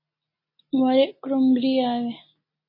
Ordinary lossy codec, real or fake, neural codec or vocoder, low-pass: MP3, 24 kbps; real; none; 5.4 kHz